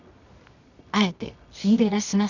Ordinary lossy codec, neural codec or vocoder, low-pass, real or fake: none; codec, 24 kHz, 0.9 kbps, WavTokenizer, medium music audio release; 7.2 kHz; fake